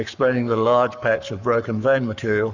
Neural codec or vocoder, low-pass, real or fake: codec, 44.1 kHz, 7.8 kbps, Pupu-Codec; 7.2 kHz; fake